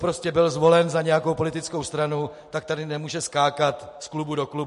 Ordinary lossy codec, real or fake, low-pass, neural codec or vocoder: MP3, 48 kbps; real; 14.4 kHz; none